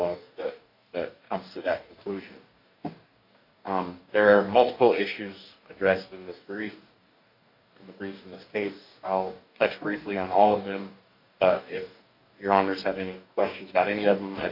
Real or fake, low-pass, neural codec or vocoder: fake; 5.4 kHz; codec, 44.1 kHz, 2.6 kbps, DAC